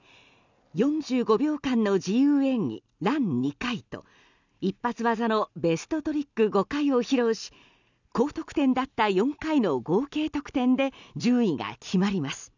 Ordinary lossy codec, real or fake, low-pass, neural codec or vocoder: none; real; 7.2 kHz; none